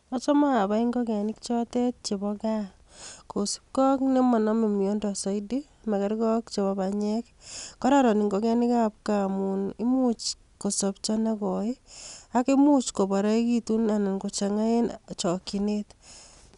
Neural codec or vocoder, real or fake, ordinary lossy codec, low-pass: none; real; none; 10.8 kHz